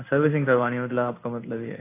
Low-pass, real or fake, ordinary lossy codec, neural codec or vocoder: 3.6 kHz; fake; AAC, 24 kbps; codec, 16 kHz in and 24 kHz out, 1 kbps, XY-Tokenizer